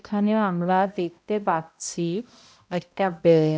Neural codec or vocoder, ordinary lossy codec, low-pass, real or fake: codec, 16 kHz, 0.5 kbps, X-Codec, HuBERT features, trained on balanced general audio; none; none; fake